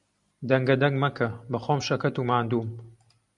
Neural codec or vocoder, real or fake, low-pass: none; real; 10.8 kHz